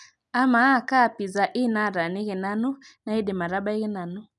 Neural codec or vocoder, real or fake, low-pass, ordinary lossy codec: none; real; 10.8 kHz; none